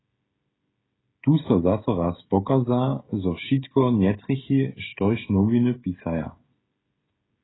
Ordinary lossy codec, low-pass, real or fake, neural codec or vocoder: AAC, 16 kbps; 7.2 kHz; fake; codec, 16 kHz, 16 kbps, FreqCodec, smaller model